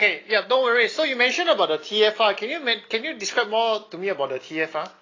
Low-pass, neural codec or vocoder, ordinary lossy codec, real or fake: 7.2 kHz; none; AAC, 32 kbps; real